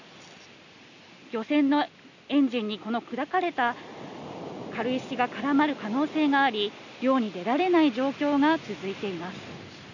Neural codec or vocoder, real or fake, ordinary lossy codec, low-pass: none; real; none; 7.2 kHz